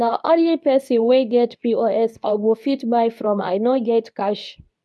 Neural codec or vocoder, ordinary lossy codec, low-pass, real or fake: codec, 24 kHz, 0.9 kbps, WavTokenizer, medium speech release version 2; none; none; fake